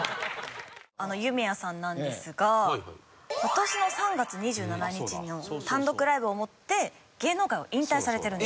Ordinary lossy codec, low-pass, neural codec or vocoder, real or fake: none; none; none; real